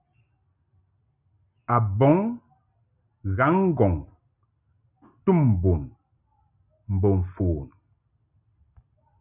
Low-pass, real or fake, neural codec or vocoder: 3.6 kHz; real; none